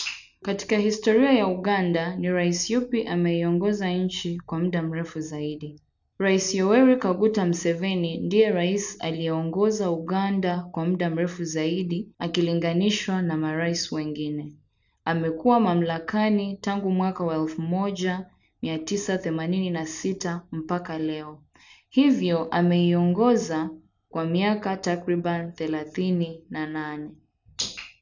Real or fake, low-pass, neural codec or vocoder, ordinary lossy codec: real; 7.2 kHz; none; none